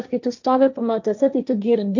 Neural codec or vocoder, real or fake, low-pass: codec, 16 kHz, 1.1 kbps, Voila-Tokenizer; fake; 7.2 kHz